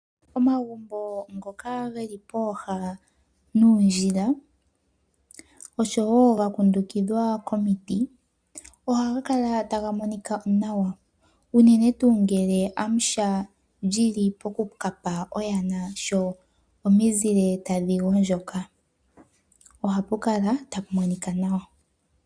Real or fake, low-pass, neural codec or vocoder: real; 9.9 kHz; none